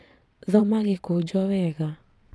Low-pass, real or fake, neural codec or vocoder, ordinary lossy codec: none; fake; vocoder, 22.05 kHz, 80 mel bands, Vocos; none